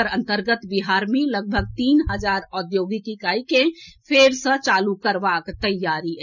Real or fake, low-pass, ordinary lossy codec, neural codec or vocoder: real; 7.2 kHz; none; none